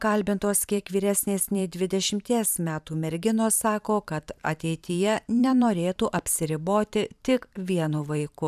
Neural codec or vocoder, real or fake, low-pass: vocoder, 44.1 kHz, 128 mel bands every 512 samples, BigVGAN v2; fake; 14.4 kHz